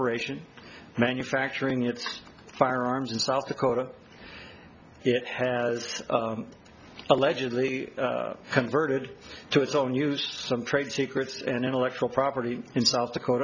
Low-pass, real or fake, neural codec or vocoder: 7.2 kHz; real; none